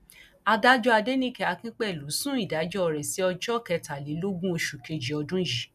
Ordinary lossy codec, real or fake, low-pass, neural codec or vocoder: none; real; 14.4 kHz; none